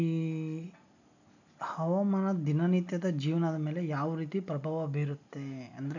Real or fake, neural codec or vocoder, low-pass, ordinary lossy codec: real; none; 7.2 kHz; none